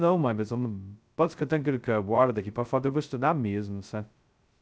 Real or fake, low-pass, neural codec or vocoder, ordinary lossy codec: fake; none; codec, 16 kHz, 0.2 kbps, FocalCodec; none